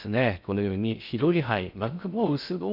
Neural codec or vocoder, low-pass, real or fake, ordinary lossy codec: codec, 16 kHz in and 24 kHz out, 0.6 kbps, FocalCodec, streaming, 4096 codes; 5.4 kHz; fake; none